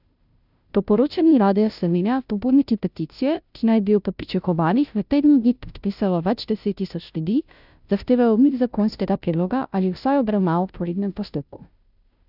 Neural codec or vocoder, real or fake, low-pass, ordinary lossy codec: codec, 16 kHz, 0.5 kbps, FunCodec, trained on Chinese and English, 25 frames a second; fake; 5.4 kHz; none